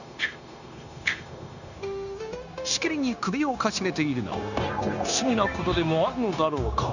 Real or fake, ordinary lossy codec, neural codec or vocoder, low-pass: fake; none; codec, 16 kHz, 0.9 kbps, LongCat-Audio-Codec; 7.2 kHz